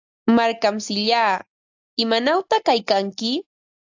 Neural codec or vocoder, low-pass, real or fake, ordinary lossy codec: none; 7.2 kHz; real; AAC, 48 kbps